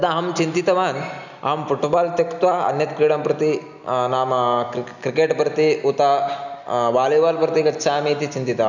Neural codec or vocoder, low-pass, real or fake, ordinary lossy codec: none; 7.2 kHz; real; none